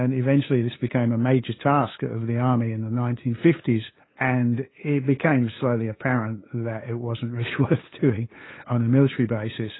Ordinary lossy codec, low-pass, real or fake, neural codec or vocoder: AAC, 16 kbps; 7.2 kHz; fake; codec, 16 kHz, 8 kbps, FunCodec, trained on LibriTTS, 25 frames a second